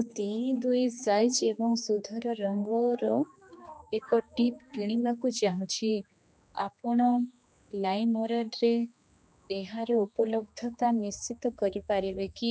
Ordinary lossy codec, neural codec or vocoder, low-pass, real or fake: none; codec, 16 kHz, 2 kbps, X-Codec, HuBERT features, trained on general audio; none; fake